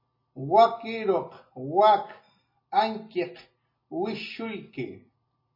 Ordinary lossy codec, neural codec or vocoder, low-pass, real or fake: MP3, 24 kbps; none; 5.4 kHz; real